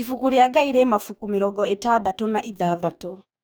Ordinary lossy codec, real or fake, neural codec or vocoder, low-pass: none; fake; codec, 44.1 kHz, 2.6 kbps, DAC; none